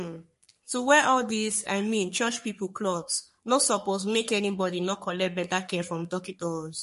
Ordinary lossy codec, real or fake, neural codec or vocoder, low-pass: MP3, 48 kbps; fake; codec, 44.1 kHz, 3.4 kbps, Pupu-Codec; 14.4 kHz